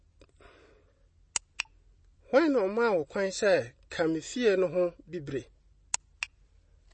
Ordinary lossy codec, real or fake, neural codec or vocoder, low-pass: MP3, 32 kbps; real; none; 9.9 kHz